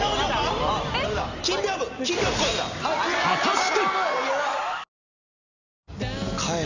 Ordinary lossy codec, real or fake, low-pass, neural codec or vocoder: none; real; 7.2 kHz; none